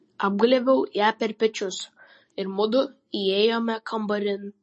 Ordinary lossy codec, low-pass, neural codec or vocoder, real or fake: MP3, 32 kbps; 9.9 kHz; none; real